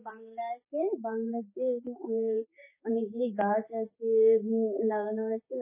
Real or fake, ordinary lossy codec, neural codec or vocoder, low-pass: fake; MP3, 16 kbps; codec, 16 kHz, 4 kbps, X-Codec, HuBERT features, trained on general audio; 3.6 kHz